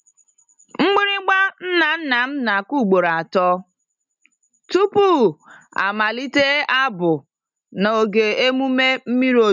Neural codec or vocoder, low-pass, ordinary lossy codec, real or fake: none; 7.2 kHz; none; real